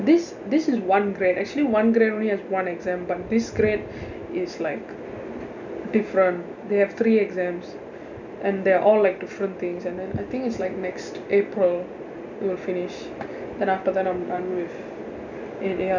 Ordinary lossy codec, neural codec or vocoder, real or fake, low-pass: none; none; real; 7.2 kHz